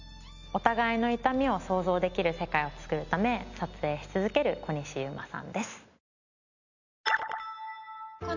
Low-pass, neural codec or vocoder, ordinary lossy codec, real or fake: 7.2 kHz; none; none; real